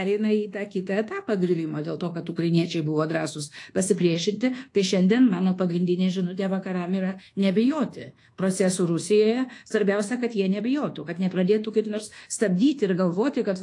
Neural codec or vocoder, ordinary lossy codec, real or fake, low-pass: codec, 24 kHz, 1.2 kbps, DualCodec; AAC, 48 kbps; fake; 10.8 kHz